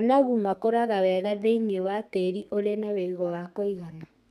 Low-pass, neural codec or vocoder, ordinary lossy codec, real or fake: 14.4 kHz; codec, 32 kHz, 1.9 kbps, SNAC; none; fake